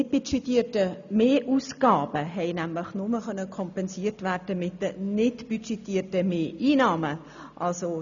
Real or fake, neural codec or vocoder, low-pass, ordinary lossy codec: real; none; 7.2 kHz; none